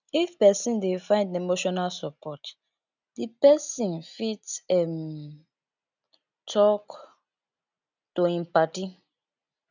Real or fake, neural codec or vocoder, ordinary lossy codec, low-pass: real; none; none; 7.2 kHz